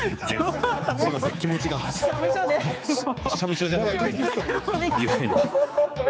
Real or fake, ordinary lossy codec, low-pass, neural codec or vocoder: fake; none; none; codec, 16 kHz, 4 kbps, X-Codec, HuBERT features, trained on general audio